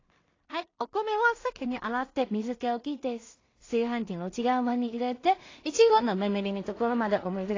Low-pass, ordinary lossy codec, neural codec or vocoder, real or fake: 7.2 kHz; AAC, 32 kbps; codec, 16 kHz in and 24 kHz out, 0.4 kbps, LongCat-Audio-Codec, two codebook decoder; fake